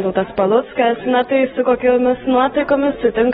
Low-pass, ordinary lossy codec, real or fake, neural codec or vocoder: 19.8 kHz; AAC, 16 kbps; real; none